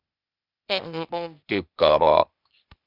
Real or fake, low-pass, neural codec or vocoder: fake; 5.4 kHz; codec, 16 kHz, 0.8 kbps, ZipCodec